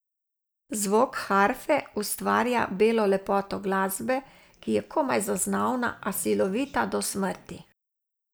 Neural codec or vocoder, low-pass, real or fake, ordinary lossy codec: none; none; real; none